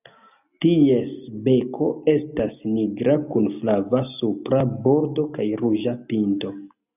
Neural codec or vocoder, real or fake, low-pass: none; real; 3.6 kHz